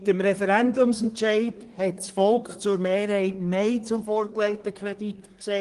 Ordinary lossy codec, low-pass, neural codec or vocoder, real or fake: Opus, 24 kbps; 10.8 kHz; codec, 24 kHz, 1 kbps, SNAC; fake